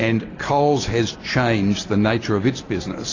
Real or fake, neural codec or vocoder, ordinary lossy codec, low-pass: real; none; AAC, 32 kbps; 7.2 kHz